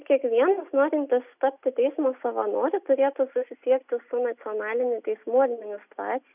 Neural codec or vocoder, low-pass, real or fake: none; 3.6 kHz; real